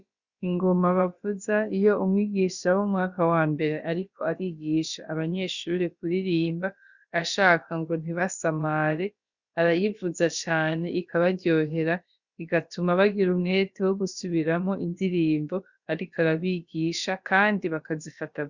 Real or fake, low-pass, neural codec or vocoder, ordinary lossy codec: fake; 7.2 kHz; codec, 16 kHz, about 1 kbps, DyCAST, with the encoder's durations; Opus, 64 kbps